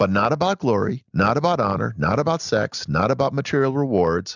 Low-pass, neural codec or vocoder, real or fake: 7.2 kHz; none; real